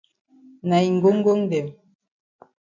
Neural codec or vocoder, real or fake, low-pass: none; real; 7.2 kHz